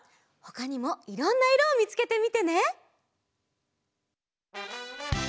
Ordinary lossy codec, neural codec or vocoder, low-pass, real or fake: none; none; none; real